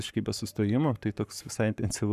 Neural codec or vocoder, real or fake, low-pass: codec, 44.1 kHz, 7.8 kbps, Pupu-Codec; fake; 14.4 kHz